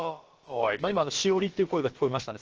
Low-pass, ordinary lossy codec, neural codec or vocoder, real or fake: 7.2 kHz; Opus, 16 kbps; codec, 16 kHz, about 1 kbps, DyCAST, with the encoder's durations; fake